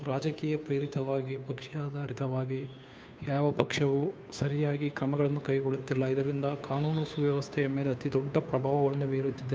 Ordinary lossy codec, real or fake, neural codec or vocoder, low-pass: none; fake; codec, 16 kHz, 2 kbps, FunCodec, trained on Chinese and English, 25 frames a second; none